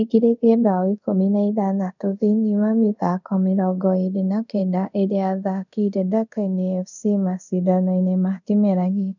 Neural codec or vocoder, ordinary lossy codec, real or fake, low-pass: codec, 24 kHz, 0.5 kbps, DualCodec; none; fake; 7.2 kHz